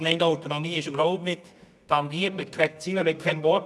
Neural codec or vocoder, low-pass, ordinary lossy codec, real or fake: codec, 24 kHz, 0.9 kbps, WavTokenizer, medium music audio release; none; none; fake